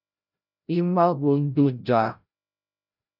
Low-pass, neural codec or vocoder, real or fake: 5.4 kHz; codec, 16 kHz, 0.5 kbps, FreqCodec, larger model; fake